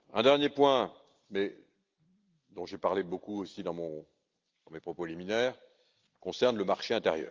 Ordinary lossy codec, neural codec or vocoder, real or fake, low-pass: Opus, 32 kbps; none; real; 7.2 kHz